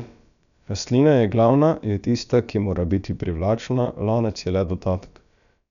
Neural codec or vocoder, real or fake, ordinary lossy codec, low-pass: codec, 16 kHz, about 1 kbps, DyCAST, with the encoder's durations; fake; none; 7.2 kHz